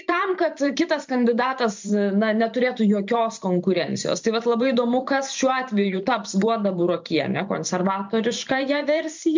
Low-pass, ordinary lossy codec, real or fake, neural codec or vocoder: 7.2 kHz; MP3, 64 kbps; fake; vocoder, 44.1 kHz, 128 mel bands every 512 samples, BigVGAN v2